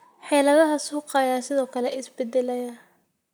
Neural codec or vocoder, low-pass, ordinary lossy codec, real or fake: vocoder, 44.1 kHz, 128 mel bands, Pupu-Vocoder; none; none; fake